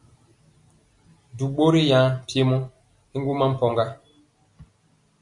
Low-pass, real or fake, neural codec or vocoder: 10.8 kHz; real; none